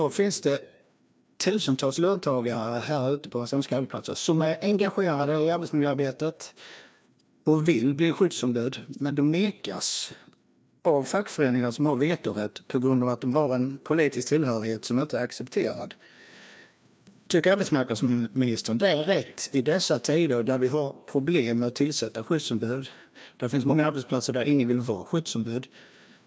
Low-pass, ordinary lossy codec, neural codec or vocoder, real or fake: none; none; codec, 16 kHz, 1 kbps, FreqCodec, larger model; fake